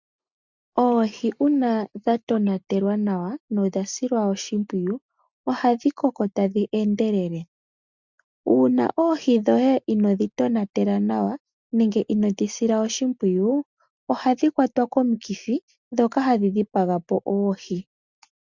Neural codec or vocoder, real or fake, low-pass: none; real; 7.2 kHz